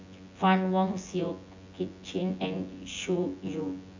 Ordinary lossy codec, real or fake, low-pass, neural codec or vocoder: AAC, 48 kbps; fake; 7.2 kHz; vocoder, 24 kHz, 100 mel bands, Vocos